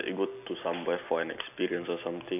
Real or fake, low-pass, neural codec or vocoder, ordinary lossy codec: real; 3.6 kHz; none; none